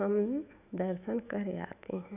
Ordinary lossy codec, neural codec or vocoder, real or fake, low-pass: none; autoencoder, 48 kHz, 128 numbers a frame, DAC-VAE, trained on Japanese speech; fake; 3.6 kHz